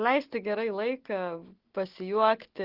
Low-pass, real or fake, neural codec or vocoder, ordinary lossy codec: 5.4 kHz; real; none; Opus, 16 kbps